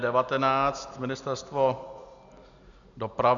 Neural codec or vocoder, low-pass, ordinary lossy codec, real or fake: none; 7.2 kHz; MP3, 96 kbps; real